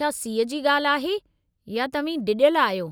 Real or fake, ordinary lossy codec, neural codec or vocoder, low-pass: real; none; none; none